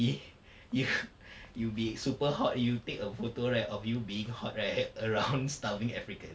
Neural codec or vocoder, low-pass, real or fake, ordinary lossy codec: none; none; real; none